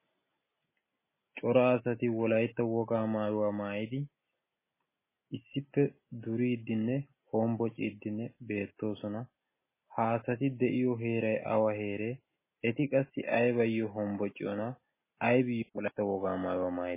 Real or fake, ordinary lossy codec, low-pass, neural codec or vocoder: real; MP3, 16 kbps; 3.6 kHz; none